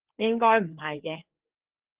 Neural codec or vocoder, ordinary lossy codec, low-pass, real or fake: codec, 16 kHz, 4 kbps, FreqCodec, larger model; Opus, 16 kbps; 3.6 kHz; fake